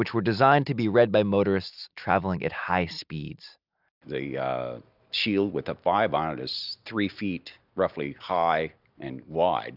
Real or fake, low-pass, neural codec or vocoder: real; 5.4 kHz; none